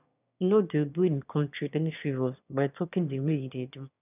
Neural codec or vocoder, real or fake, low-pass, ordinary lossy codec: autoencoder, 22.05 kHz, a latent of 192 numbers a frame, VITS, trained on one speaker; fake; 3.6 kHz; none